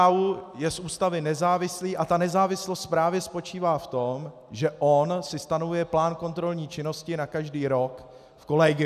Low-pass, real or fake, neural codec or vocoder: 14.4 kHz; real; none